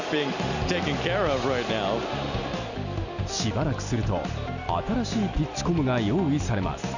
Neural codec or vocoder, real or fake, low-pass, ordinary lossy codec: none; real; 7.2 kHz; none